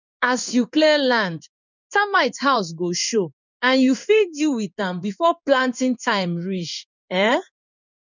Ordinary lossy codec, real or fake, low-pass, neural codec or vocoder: none; fake; 7.2 kHz; codec, 16 kHz in and 24 kHz out, 1 kbps, XY-Tokenizer